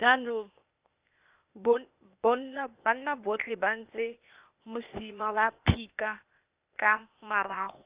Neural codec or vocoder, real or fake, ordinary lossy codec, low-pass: codec, 16 kHz, 0.8 kbps, ZipCodec; fake; Opus, 64 kbps; 3.6 kHz